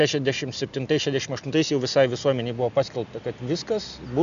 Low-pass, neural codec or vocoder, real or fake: 7.2 kHz; none; real